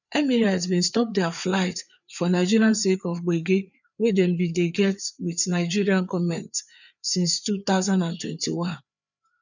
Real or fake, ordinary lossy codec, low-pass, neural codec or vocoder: fake; none; 7.2 kHz; codec, 16 kHz, 4 kbps, FreqCodec, larger model